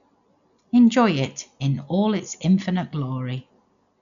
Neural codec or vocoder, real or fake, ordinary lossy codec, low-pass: none; real; none; 7.2 kHz